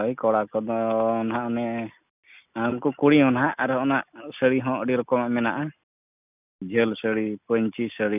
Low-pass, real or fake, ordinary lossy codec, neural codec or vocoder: 3.6 kHz; real; none; none